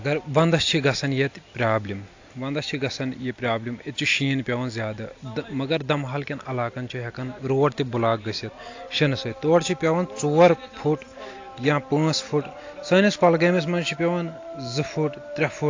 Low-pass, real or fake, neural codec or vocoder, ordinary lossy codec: 7.2 kHz; real; none; AAC, 48 kbps